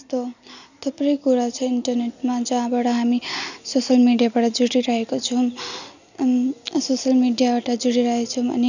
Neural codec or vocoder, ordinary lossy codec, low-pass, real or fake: none; none; 7.2 kHz; real